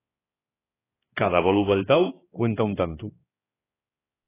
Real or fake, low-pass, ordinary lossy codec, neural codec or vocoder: fake; 3.6 kHz; AAC, 16 kbps; codec, 16 kHz, 2 kbps, X-Codec, HuBERT features, trained on balanced general audio